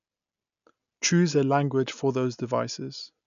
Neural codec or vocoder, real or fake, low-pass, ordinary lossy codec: none; real; 7.2 kHz; none